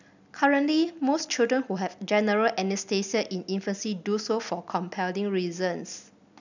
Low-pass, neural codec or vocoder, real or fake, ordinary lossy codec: 7.2 kHz; none; real; none